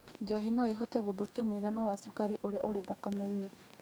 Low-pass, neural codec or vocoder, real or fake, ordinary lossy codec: none; codec, 44.1 kHz, 2.6 kbps, DAC; fake; none